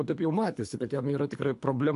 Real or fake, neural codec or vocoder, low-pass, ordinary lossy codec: fake; codec, 24 kHz, 3 kbps, HILCodec; 10.8 kHz; AAC, 64 kbps